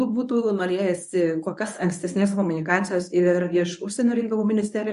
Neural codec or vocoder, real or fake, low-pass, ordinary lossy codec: codec, 24 kHz, 0.9 kbps, WavTokenizer, medium speech release version 1; fake; 10.8 kHz; AAC, 96 kbps